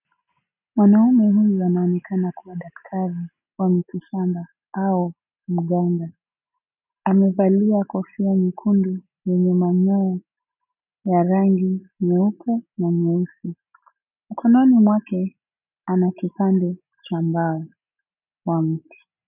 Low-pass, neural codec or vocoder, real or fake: 3.6 kHz; none; real